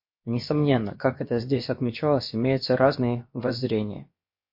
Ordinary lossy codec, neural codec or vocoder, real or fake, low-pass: MP3, 32 kbps; codec, 16 kHz, about 1 kbps, DyCAST, with the encoder's durations; fake; 5.4 kHz